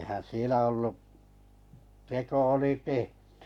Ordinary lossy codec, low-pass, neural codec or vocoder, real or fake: MP3, 96 kbps; 19.8 kHz; codec, 44.1 kHz, 7.8 kbps, Pupu-Codec; fake